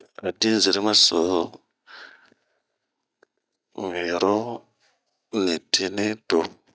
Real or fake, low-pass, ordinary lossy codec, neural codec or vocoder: real; none; none; none